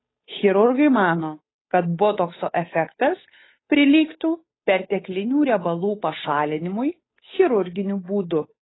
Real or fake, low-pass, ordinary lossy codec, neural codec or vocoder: fake; 7.2 kHz; AAC, 16 kbps; codec, 16 kHz, 8 kbps, FunCodec, trained on Chinese and English, 25 frames a second